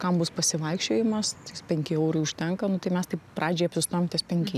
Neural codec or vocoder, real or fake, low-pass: none; real; 14.4 kHz